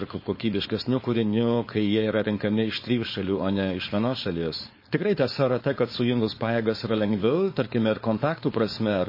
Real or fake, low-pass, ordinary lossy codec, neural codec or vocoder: fake; 5.4 kHz; MP3, 24 kbps; codec, 16 kHz, 4.8 kbps, FACodec